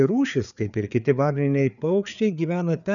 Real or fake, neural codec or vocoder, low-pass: fake; codec, 16 kHz, 4 kbps, FreqCodec, larger model; 7.2 kHz